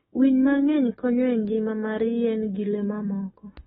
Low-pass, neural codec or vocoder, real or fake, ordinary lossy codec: 19.8 kHz; autoencoder, 48 kHz, 128 numbers a frame, DAC-VAE, trained on Japanese speech; fake; AAC, 16 kbps